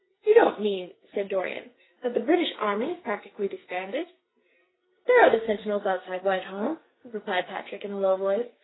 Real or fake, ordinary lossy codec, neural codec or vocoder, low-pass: fake; AAC, 16 kbps; codec, 44.1 kHz, 2.6 kbps, SNAC; 7.2 kHz